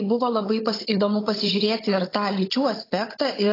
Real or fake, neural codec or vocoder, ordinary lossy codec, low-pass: fake; codec, 16 kHz, 16 kbps, FunCodec, trained on Chinese and English, 50 frames a second; AAC, 24 kbps; 5.4 kHz